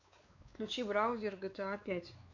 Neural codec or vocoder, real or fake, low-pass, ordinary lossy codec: codec, 16 kHz, 4 kbps, X-Codec, WavLM features, trained on Multilingual LibriSpeech; fake; 7.2 kHz; AAC, 48 kbps